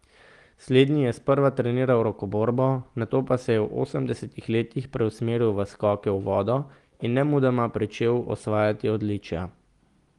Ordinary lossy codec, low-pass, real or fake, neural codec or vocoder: Opus, 24 kbps; 10.8 kHz; real; none